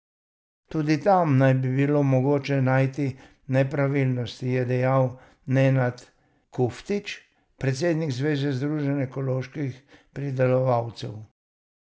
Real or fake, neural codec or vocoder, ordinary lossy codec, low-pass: real; none; none; none